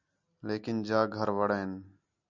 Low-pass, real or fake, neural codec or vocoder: 7.2 kHz; real; none